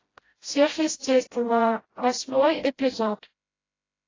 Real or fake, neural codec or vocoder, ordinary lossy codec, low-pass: fake; codec, 16 kHz, 0.5 kbps, FreqCodec, smaller model; AAC, 32 kbps; 7.2 kHz